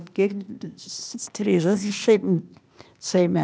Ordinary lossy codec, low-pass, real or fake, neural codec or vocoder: none; none; fake; codec, 16 kHz, 0.8 kbps, ZipCodec